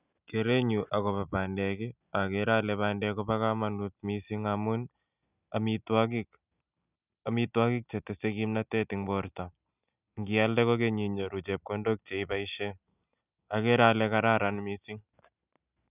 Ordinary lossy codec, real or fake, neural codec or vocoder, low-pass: none; real; none; 3.6 kHz